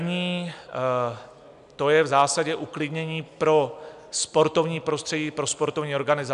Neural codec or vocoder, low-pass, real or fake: none; 10.8 kHz; real